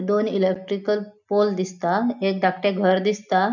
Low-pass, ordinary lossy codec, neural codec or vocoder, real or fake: 7.2 kHz; none; none; real